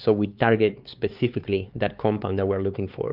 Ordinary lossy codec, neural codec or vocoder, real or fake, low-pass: Opus, 32 kbps; codec, 16 kHz, 4 kbps, X-Codec, HuBERT features, trained on LibriSpeech; fake; 5.4 kHz